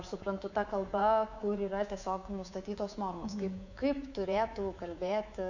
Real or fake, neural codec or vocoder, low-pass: fake; codec, 24 kHz, 3.1 kbps, DualCodec; 7.2 kHz